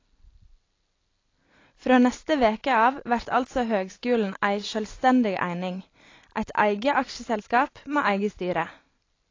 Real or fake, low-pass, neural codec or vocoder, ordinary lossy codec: real; 7.2 kHz; none; AAC, 32 kbps